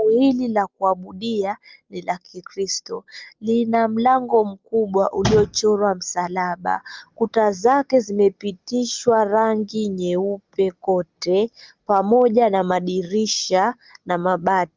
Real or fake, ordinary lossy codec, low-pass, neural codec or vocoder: real; Opus, 32 kbps; 7.2 kHz; none